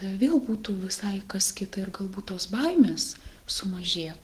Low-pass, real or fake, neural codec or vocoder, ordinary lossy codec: 14.4 kHz; fake; autoencoder, 48 kHz, 128 numbers a frame, DAC-VAE, trained on Japanese speech; Opus, 16 kbps